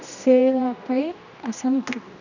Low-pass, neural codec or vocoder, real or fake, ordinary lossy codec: 7.2 kHz; codec, 16 kHz, 1 kbps, X-Codec, HuBERT features, trained on general audio; fake; none